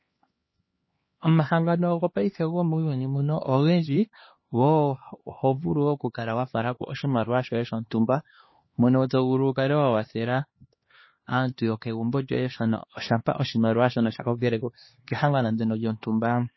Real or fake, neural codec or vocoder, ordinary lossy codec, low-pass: fake; codec, 16 kHz, 2 kbps, X-Codec, HuBERT features, trained on LibriSpeech; MP3, 24 kbps; 7.2 kHz